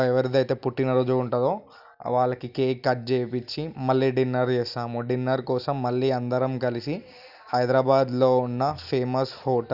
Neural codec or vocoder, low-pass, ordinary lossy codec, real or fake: none; 5.4 kHz; none; real